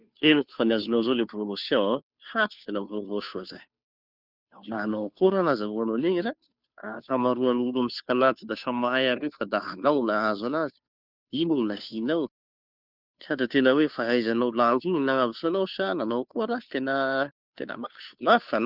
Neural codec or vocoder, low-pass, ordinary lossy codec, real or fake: codec, 16 kHz, 2 kbps, FunCodec, trained on Chinese and English, 25 frames a second; 5.4 kHz; none; fake